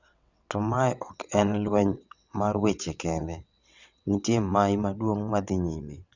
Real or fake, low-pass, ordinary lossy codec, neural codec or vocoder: fake; 7.2 kHz; none; vocoder, 22.05 kHz, 80 mel bands, WaveNeXt